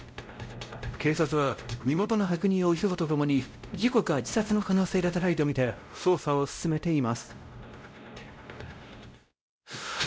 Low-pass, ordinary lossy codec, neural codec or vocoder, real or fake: none; none; codec, 16 kHz, 0.5 kbps, X-Codec, WavLM features, trained on Multilingual LibriSpeech; fake